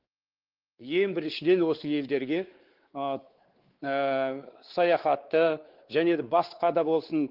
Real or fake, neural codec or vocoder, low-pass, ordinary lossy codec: fake; codec, 16 kHz, 4 kbps, X-Codec, WavLM features, trained on Multilingual LibriSpeech; 5.4 kHz; Opus, 16 kbps